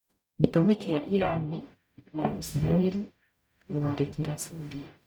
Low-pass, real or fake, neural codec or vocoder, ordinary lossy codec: none; fake; codec, 44.1 kHz, 0.9 kbps, DAC; none